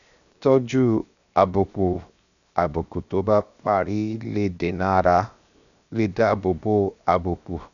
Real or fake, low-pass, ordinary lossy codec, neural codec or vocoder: fake; 7.2 kHz; none; codec, 16 kHz, 0.7 kbps, FocalCodec